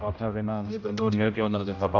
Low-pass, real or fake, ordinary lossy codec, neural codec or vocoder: 7.2 kHz; fake; none; codec, 16 kHz, 0.5 kbps, X-Codec, HuBERT features, trained on general audio